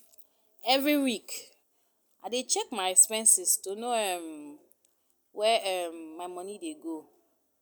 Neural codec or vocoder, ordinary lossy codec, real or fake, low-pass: none; none; real; none